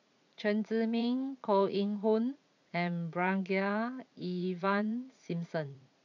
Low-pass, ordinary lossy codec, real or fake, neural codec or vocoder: 7.2 kHz; none; fake; vocoder, 44.1 kHz, 80 mel bands, Vocos